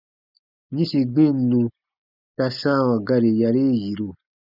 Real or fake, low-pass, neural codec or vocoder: real; 5.4 kHz; none